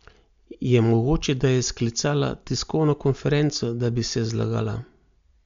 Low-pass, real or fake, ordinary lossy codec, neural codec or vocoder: 7.2 kHz; real; MP3, 64 kbps; none